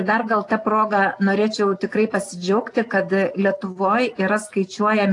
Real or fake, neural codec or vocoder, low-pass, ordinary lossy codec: real; none; 10.8 kHz; AAC, 48 kbps